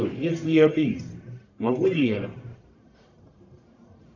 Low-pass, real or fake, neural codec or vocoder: 7.2 kHz; fake; codec, 44.1 kHz, 1.7 kbps, Pupu-Codec